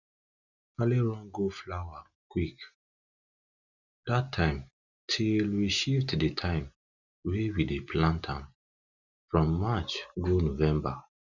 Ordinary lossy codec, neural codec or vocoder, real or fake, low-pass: none; none; real; 7.2 kHz